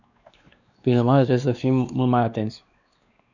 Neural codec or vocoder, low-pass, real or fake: codec, 16 kHz, 2 kbps, X-Codec, WavLM features, trained on Multilingual LibriSpeech; 7.2 kHz; fake